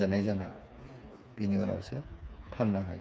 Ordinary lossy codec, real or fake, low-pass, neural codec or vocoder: none; fake; none; codec, 16 kHz, 4 kbps, FreqCodec, smaller model